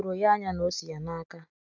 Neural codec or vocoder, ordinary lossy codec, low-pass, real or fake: none; none; 7.2 kHz; real